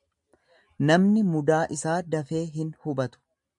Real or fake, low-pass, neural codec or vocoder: real; 10.8 kHz; none